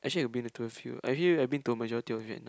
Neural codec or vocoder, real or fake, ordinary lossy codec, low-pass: none; real; none; none